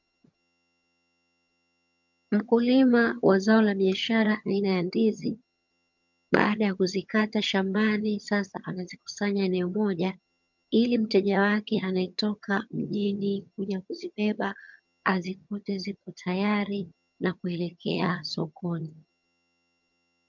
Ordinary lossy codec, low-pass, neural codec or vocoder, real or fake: MP3, 64 kbps; 7.2 kHz; vocoder, 22.05 kHz, 80 mel bands, HiFi-GAN; fake